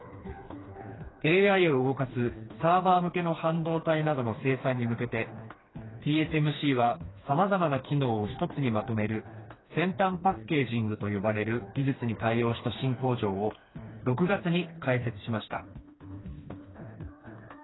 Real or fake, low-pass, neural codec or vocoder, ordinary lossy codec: fake; 7.2 kHz; codec, 16 kHz, 2 kbps, FreqCodec, smaller model; AAC, 16 kbps